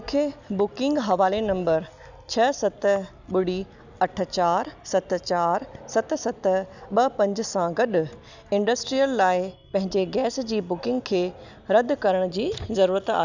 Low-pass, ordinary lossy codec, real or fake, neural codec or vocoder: 7.2 kHz; none; real; none